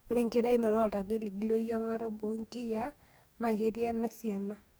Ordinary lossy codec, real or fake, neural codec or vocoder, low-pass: none; fake; codec, 44.1 kHz, 2.6 kbps, DAC; none